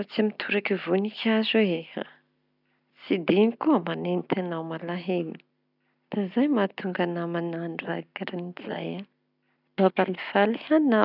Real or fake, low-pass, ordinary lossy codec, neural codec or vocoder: real; 5.4 kHz; none; none